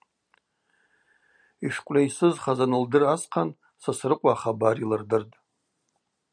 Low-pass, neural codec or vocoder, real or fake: 9.9 kHz; none; real